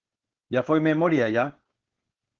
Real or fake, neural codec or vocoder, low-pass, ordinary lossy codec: fake; codec, 16 kHz, 4.8 kbps, FACodec; 7.2 kHz; Opus, 16 kbps